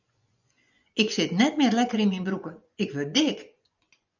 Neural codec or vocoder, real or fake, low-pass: vocoder, 24 kHz, 100 mel bands, Vocos; fake; 7.2 kHz